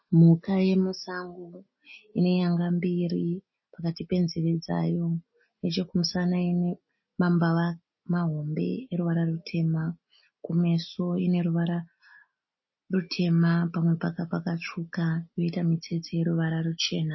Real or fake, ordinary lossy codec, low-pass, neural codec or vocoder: real; MP3, 24 kbps; 7.2 kHz; none